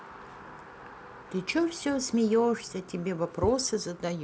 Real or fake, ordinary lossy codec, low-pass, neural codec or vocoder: real; none; none; none